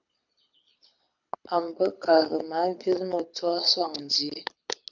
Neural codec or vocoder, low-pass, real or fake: codec, 24 kHz, 6 kbps, HILCodec; 7.2 kHz; fake